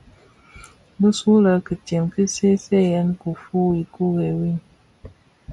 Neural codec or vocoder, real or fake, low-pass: none; real; 10.8 kHz